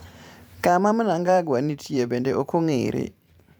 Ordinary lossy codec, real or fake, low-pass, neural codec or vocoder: none; fake; none; vocoder, 44.1 kHz, 128 mel bands every 512 samples, BigVGAN v2